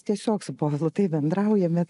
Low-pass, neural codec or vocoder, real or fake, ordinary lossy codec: 10.8 kHz; none; real; AAC, 96 kbps